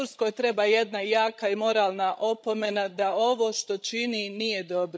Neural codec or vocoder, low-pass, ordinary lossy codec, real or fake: codec, 16 kHz, 16 kbps, FreqCodec, larger model; none; none; fake